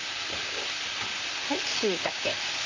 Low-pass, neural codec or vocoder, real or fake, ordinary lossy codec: 7.2 kHz; vocoder, 44.1 kHz, 128 mel bands, Pupu-Vocoder; fake; MP3, 64 kbps